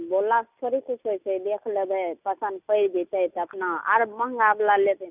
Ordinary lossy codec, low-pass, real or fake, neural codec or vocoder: none; 3.6 kHz; real; none